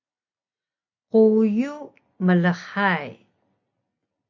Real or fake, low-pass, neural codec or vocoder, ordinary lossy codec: real; 7.2 kHz; none; MP3, 48 kbps